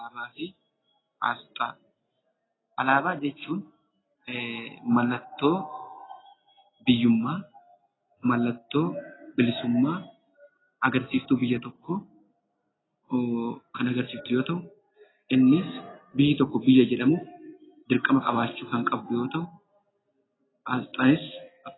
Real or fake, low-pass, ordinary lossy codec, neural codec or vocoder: real; 7.2 kHz; AAC, 16 kbps; none